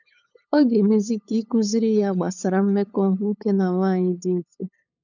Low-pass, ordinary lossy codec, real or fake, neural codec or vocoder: 7.2 kHz; none; fake; codec, 16 kHz, 8 kbps, FunCodec, trained on LibriTTS, 25 frames a second